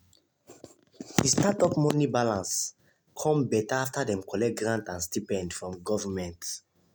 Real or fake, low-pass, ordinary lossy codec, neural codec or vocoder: real; none; none; none